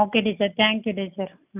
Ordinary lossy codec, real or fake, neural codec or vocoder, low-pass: none; real; none; 3.6 kHz